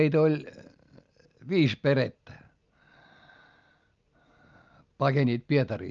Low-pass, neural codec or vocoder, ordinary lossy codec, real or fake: 7.2 kHz; none; Opus, 24 kbps; real